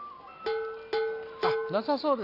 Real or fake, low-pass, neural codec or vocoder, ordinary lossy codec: real; 5.4 kHz; none; none